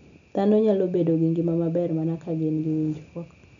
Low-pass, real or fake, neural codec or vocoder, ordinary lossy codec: 7.2 kHz; real; none; none